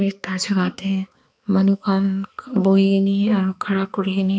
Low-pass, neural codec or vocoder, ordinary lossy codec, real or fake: none; codec, 16 kHz, 2 kbps, X-Codec, HuBERT features, trained on balanced general audio; none; fake